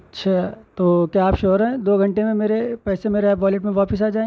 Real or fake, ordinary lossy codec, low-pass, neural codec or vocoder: real; none; none; none